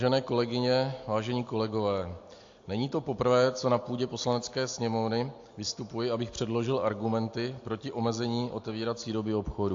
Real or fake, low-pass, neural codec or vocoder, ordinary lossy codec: real; 7.2 kHz; none; AAC, 48 kbps